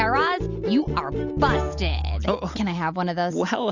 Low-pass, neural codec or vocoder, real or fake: 7.2 kHz; none; real